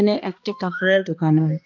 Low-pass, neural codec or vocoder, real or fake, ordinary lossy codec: 7.2 kHz; codec, 16 kHz, 1 kbps, X-Codec, HuBERT features, trained on balanced general audio; fake; none